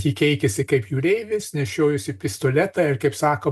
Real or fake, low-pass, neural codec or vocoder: real; 14.4 kHz; none